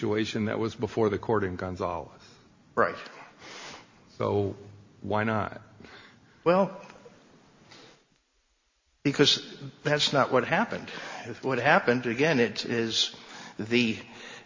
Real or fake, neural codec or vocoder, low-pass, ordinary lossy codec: real; none; 7.2 kHz; MP3, 32 kbps